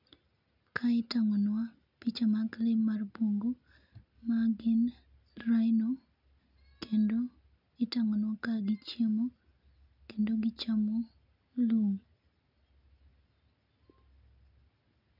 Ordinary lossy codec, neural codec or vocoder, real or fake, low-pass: none; none; real; 5.4 kHz